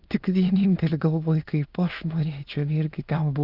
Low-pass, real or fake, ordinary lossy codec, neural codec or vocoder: 5.4 kHz; fake; Opus, 24 kbps; autoencoder, 22.05 kHz, a latent of 192 numbers a frame, VITS, trained on many speakers